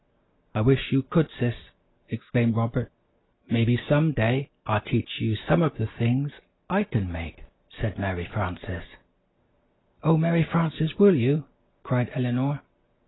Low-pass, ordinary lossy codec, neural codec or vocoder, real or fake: 7.2 kHz; AAC, 16 kbps; none; real